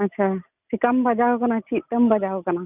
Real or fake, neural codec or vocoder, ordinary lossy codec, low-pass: real; none; none; 3.6 kHz